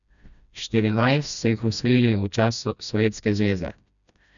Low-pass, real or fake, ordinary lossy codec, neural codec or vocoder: 7.2 kHz; fake; none; codec, 16 kHz, 1 kbps, FreqCodec, smaller model